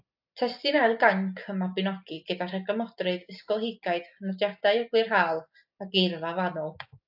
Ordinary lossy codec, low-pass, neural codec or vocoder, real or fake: AAC, 48 kbps; 5.4 kHz; none; real